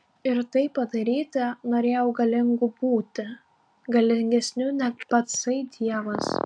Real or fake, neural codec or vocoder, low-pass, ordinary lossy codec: real; none; 9.9 kHz; AAC, 64 kbps